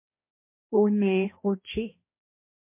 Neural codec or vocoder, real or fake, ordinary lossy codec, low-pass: codec, 16 kHz, 1 kbps, X-Codec, HuBERT features, trained on balanced general audio; fake; MP3, 24 kbps; 3.6 kHz